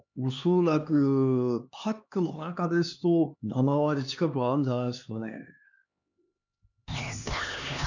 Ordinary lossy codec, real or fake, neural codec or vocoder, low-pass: none; fake; codec, 16 kHz, 2 kbps, X-Codec, HuBERT features, trained on LibriSpeech; 7.2 kHz